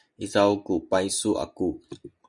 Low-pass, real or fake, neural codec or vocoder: 10.8 kHz; real; none